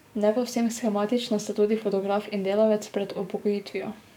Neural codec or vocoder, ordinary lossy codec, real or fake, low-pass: codec, 44.1 kHz, 7.8 kbps, DAC; none; fake; 19.8 kHz